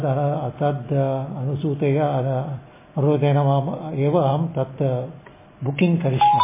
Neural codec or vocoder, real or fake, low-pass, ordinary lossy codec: none; real; 3.6 kHz; MP3, 16 kbps